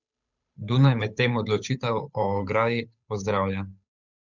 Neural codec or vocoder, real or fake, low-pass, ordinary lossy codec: codec, 16 kHz, 8 kbps, FunCodec, trained on Chinese and English, 25 frames a second; fake; 7.2 kHz; none